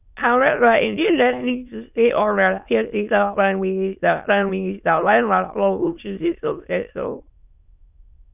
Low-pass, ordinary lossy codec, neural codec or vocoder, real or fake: 3.6 kHz; none; autoencoder, 22.05 kHz, a latent of 192 numbers a frame, VITS, trained on many speakers; fake